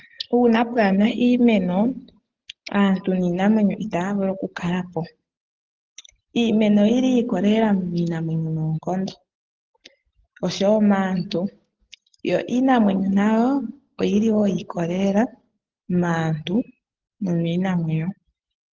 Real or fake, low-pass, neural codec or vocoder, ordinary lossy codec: real; 7.2 kHz; none; Opus, 16 kbps